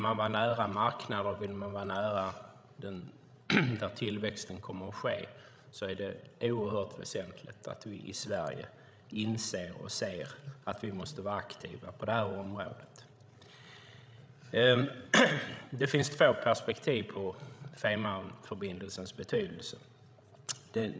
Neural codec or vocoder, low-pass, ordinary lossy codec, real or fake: codec, 16 kHz, 16 kbps, FreqCodec, larger model; none; none; fake